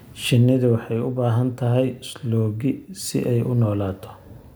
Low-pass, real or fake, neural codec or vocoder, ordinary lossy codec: none; real; none; none